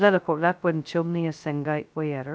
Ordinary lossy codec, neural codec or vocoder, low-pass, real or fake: none; codec, 16 kHz, 0.2 kbps, FocalCodec; none; fake